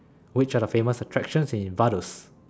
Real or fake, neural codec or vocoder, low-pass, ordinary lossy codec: real; none; none; none